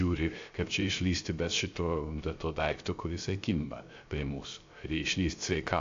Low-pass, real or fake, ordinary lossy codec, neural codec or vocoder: 7.2 kHz; fake; AAC, 48 kbps; codec, 16 kHz, 0.3 kbps, FocalCodec